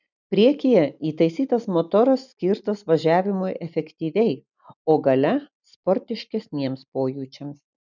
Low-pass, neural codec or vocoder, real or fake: 7.2 kHz; none; real